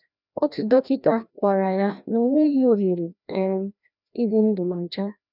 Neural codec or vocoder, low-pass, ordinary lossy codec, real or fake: codec, 16 kHz, 1 kbps, FreqCodec, larger model; 5.4 kHz; none; fake